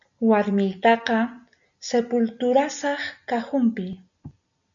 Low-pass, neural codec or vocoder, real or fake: 7.2 kHz; none; real